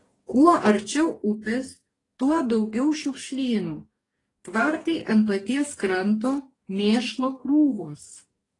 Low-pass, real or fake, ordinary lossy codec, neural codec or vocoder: 10.8 kHz; fake; AAC, 32 kbps; codec, 44.1 kHz, 2.6 kbps, DAC